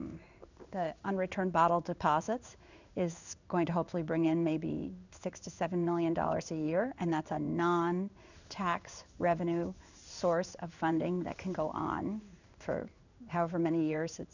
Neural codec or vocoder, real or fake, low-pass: codec, 16 kHz in and 24 kHz out, 1 kbps, XY-Tokenizer; fake; 7.2 kHz